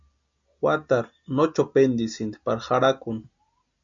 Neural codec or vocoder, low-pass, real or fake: none; 7.2 kHz; real